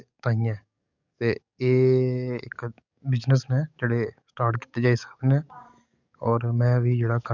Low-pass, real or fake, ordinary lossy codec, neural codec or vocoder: 7.2 kHz; fake; none; codec, 44.1 kHz, 7.8 kbps, DAC